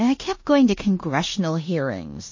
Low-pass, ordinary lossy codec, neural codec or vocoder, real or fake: 7.2 kHz; MP3, 32 kbps; codec, 16 kHz in and 24 kHz out, 0.9 kbps, LongCat-Audio-Codec, four codebook decoder; fake